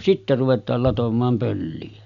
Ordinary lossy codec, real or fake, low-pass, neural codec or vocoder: none; real; 7.2 kHz; none